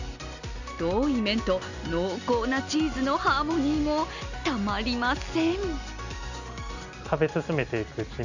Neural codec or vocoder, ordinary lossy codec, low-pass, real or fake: none; none; 7.2 kHz; real